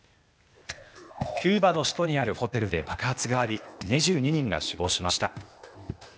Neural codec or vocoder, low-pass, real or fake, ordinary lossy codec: codec, 16 kHz, 0.8 kbps, ZipCodec; none; fake; none